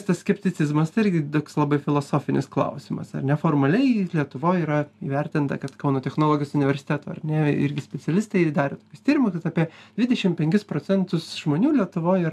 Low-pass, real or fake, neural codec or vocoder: 14.4 kHz; real; none